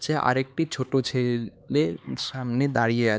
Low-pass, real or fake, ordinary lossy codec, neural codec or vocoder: none; fake; none; codec, 16 kHz, 4 kbps, X-Codec, HuBERT features, trained on LibriSpeech